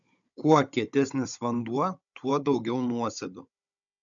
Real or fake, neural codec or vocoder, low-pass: fake; codec, 16 kHz, 16 kbps, FunCodec, trained on Chinese and English, 50 frames a second; 7.2 kHz